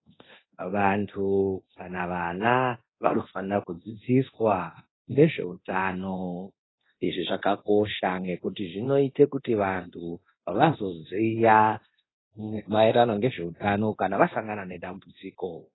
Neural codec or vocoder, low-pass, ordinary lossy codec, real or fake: codec, 24 kHz, 0.9 kbps, DualCodec; 7.2 kHz; AAC, 16 kbps; fake